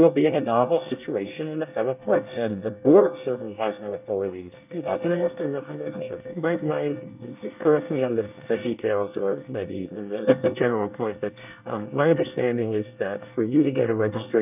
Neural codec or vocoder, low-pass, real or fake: codec, 24 kHz, 1 kbps, SNAC; 3.6 kHz; fake